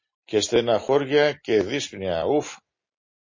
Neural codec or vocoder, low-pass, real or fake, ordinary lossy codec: none; 7.2 kHz; real; MP3, 32 kbps